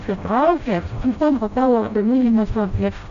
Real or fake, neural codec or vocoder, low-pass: fake; codec, 16 kHz, 0.5 kbps, FreqCodec, smaller model; 7.2 kHz